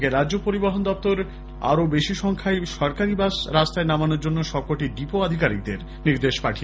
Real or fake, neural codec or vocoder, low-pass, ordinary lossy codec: real; none; none; none